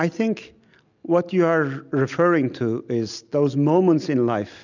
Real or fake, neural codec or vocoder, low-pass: real; none; 7.2 kHz